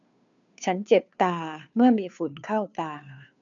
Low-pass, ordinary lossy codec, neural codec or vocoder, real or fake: 7.2 kHz; none; codec, 16 kHz, 2 kbps, FunCodec, trained on Chinese and English, 25 frames a second; fake